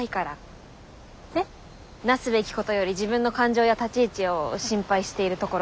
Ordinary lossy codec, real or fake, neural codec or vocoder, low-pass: none; real; none; none